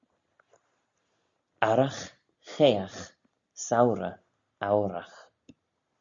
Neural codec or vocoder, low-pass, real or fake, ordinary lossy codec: none; 7.2 kHz; real; Opus, 64 kbps